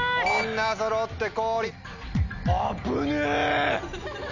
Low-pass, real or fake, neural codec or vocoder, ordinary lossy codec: 7.2 kHz; real; none; none